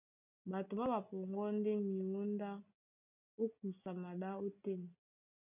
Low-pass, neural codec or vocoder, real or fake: 3.6 kHz; none; real